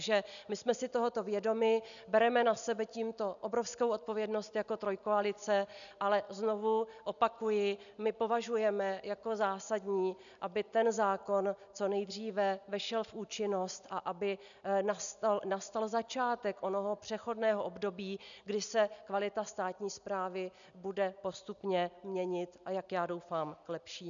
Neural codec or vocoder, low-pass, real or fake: none; 7.2 kHz; real